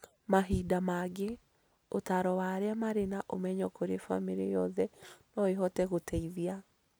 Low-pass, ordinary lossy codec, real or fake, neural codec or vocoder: none; none; real; none